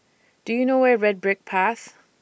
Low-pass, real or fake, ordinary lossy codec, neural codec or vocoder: none; real; none; none